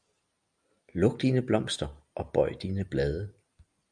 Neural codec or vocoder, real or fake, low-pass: none; real; 9.9 kHz